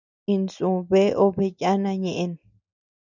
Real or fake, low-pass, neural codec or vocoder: real; 7.2 kHz; none